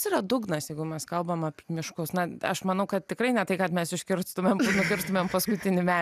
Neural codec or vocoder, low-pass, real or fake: none; 14.4 kHz; real